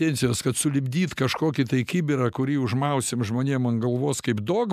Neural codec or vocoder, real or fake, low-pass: none; real; 14.4 kHz